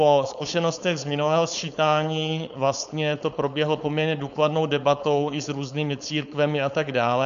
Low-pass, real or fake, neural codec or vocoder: 7.2 kHz; fake; codec, 16 kHz, 4.8 kbps, FACodec